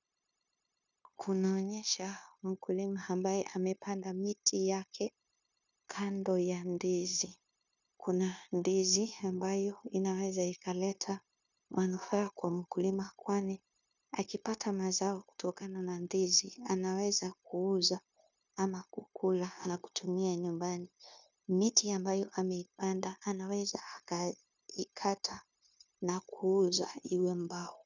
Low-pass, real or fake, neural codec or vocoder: 7.2 kHz; fake; codec, 16 kHz, 0.9 kbps, LongCat-Audio-Codec